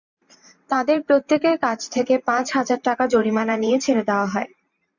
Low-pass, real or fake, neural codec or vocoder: 7.2 kHz; fake; vocoder, 44.1 kHz, 128 mel bands every 512 samples, BigVGAN v2